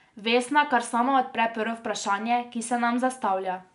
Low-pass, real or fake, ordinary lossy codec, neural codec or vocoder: 10.8 kHz; real; none; none